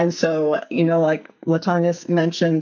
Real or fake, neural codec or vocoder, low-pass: fake; codec, 44.1 kHz, 2.6 kbps, SNAC; 7.2 kHz